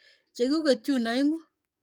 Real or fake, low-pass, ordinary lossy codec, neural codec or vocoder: fake; 19.8 kHz; none; codec, 44.1 kHz, 7.8 kbps, DAC